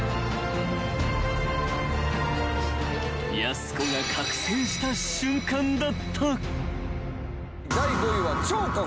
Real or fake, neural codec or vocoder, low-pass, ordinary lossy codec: real; none; none; none